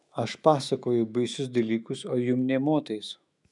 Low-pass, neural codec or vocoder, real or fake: 10.8 kHz; autoencoder, 48 kHz, 128 numbers a frame, DAC-VAE, trained on Japanese speech; fake